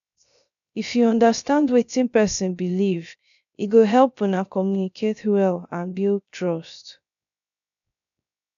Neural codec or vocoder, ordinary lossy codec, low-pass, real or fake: codec, 16 kHz, 0.3 kbps, FocalCodec; none; 7.2 kHz; fake